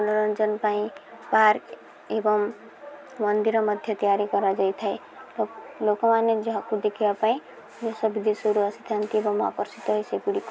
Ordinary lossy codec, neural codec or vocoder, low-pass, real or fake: none; none; none; real